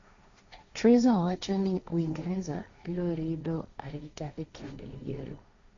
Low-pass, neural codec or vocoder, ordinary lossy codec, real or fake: 7.2 kHz; codec, 16 kHz, 1.1 kbps, Voila-Tokenizer; AAC, 64 kbps; fake